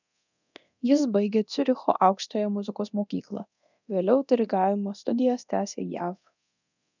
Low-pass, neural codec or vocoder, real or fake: 7.2 kHz; codec, 24 kHz, 0.9 kbps, DualCodec; fake